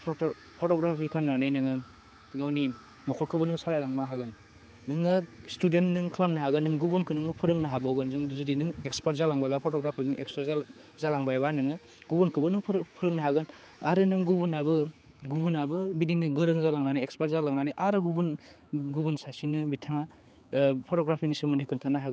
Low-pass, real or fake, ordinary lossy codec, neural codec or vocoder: none; fake; none; codec, 16 kHz, 4 kbps, X-Codec, HuBERT features, trained on general audio